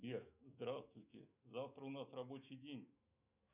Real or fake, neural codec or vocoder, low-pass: fake; autoencoder, 48 kHz, 128 numbers a frame, DAC-VAE, trained on Japanese speech; 3.6 kHz